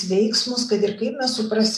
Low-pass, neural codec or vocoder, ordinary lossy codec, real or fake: 14.4 kHz; none; AAC, 96 kbps; real